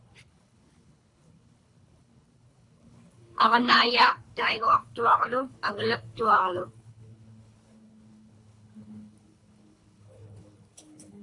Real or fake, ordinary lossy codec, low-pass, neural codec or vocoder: fake; AAC, 48 kbps; 10.8 kHz; codec, 24 kHz, 3 kbps, HILCodec